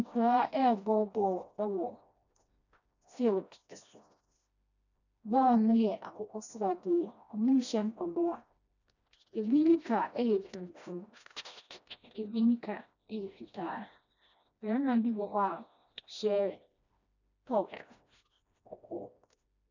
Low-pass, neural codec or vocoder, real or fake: 7.2 kHz; codec, 16 kHz, 1 kbps, FreqCodec, smaller model; fake